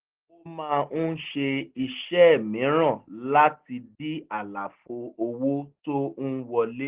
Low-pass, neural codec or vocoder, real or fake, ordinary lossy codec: 3.6 kHz; none; real; Opus, 16 kbps